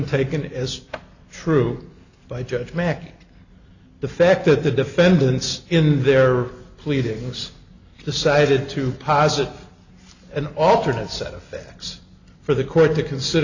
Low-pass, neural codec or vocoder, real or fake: 7.2 kHz; none; real